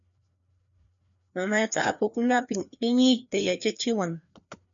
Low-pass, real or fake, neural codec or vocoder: 7.2 kHz; fake; codec, 16 kHz, 2 kbps, FreqCodec, larger model